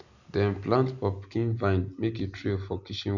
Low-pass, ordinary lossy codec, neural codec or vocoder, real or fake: 7.2 kHz; none; none; real